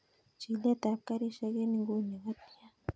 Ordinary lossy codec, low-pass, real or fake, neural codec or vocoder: none; none; real; none